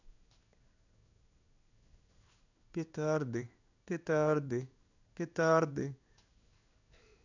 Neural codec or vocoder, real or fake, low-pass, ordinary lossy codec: codec, 16 kHz in and 24 kHz out, 1 kbps, XY-Tokenizer; fake; 7.2 kHz; none